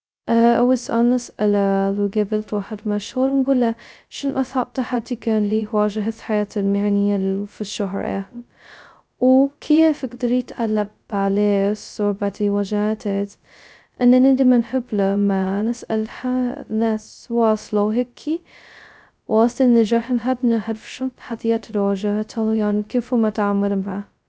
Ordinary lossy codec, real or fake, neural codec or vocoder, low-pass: none; fake; codec, 16 kHz, 0.2 kbps, FocalCodec; none